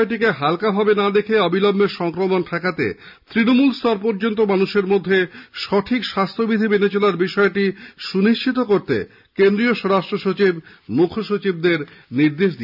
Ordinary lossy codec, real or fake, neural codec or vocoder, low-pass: none; real; none; 5.4 kHz